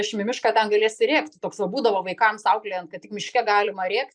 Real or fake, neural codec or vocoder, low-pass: real; none; 9.9 kHz